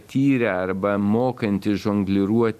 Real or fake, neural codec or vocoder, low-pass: real; none; 14.4 kHz